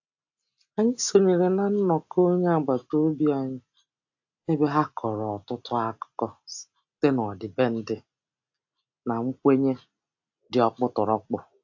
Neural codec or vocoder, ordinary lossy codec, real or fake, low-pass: none; AAC, 48 kbps; real; 7.2 kHz